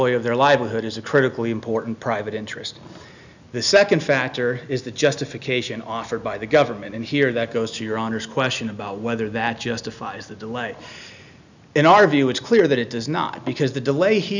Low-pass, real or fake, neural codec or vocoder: 7.2 kHz; real; none